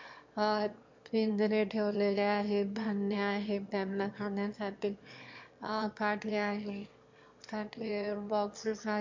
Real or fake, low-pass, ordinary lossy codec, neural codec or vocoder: fake; 7.2 kHz; MP3, 48 kbps; autoencoder, 22.05 kHz, a latent of 192 numbers a frame, VITS, trained on one speaker